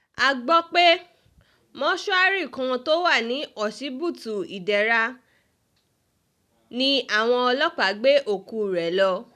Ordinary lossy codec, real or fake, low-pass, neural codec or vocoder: none; real; 14.4 kHz; none